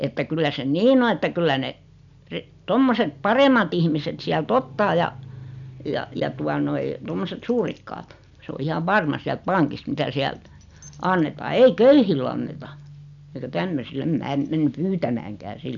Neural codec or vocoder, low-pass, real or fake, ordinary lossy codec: none; 7.2 kHz; real; none